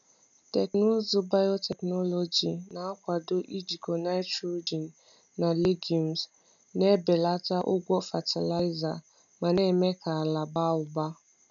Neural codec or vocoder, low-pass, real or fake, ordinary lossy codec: none; 7.2 kHz; real; none